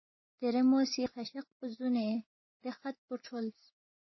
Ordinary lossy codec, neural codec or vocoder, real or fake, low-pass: MP3, 24 kbps; none; real; 7.2 kHz